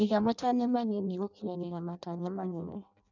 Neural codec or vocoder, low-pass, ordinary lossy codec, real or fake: codec, 16 kHz in and 24 kHz out, 0.6 kbps, FireRedTTS-2 codec; 7.2 kHz; none; fake